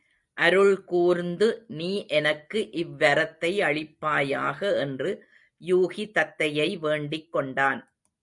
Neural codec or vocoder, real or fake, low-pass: none; real; 10.8 kHz